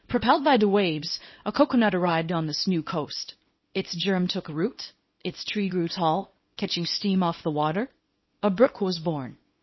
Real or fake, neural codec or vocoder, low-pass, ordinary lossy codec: fake; codec, 24 kHz, 0.9 kbps, WavTokenizer, small release; 7.2 kHz; MP3, 24 kbps